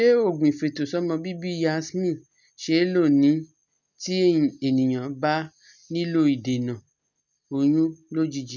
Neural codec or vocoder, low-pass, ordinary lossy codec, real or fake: none; 7.2 kHz; none; real